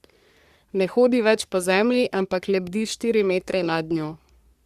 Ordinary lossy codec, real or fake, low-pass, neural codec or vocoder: Opus, 64 kbps; fake; 14.4 kHz; codec, 44.1 kHz, 3.4 kbps, Pupu-Codec